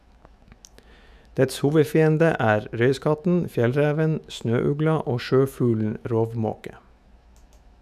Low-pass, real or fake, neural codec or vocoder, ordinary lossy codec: 14.4 kHz; fake; autoencoder, 48 kHz, 128 numbers a frame, DAC-VAE, trained on Japanese speech; none